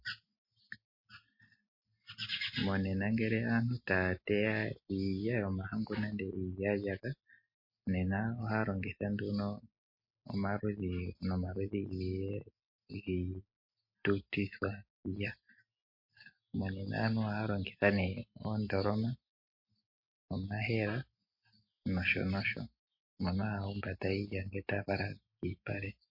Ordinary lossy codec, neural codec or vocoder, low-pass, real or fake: MP3, 32 kbps; none; 5.4 kHz; real